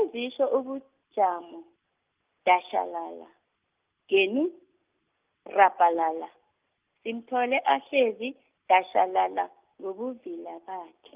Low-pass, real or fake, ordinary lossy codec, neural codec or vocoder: 3.6 kHz; real; Opus, 24 kbps; none